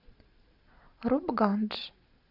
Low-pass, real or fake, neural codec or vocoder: 5.4 kHz; real; none